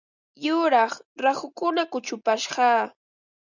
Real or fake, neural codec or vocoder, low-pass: real; none; 7.2 kHz